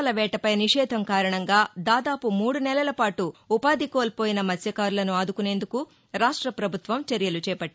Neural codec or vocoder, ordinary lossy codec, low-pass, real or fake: none; none; none; real